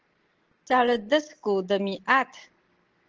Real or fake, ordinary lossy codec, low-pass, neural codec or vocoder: real; Opus, 16 kbps; 7.2 kHz; none